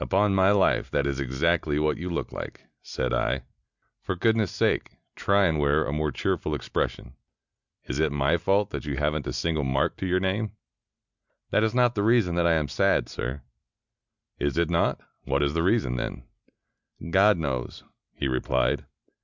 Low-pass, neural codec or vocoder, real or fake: 7.2 kHz; none; real